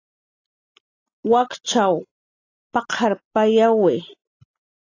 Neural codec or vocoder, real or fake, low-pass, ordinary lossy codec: none; real; 7.2 kHz; AAC, 32 kbps